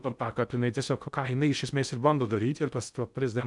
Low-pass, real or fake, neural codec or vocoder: 10.8 kHz; fake; codec, 16 kHz in and 24 kHz out, 0.6 kbps, FocalCodec, streaming, 2048 codes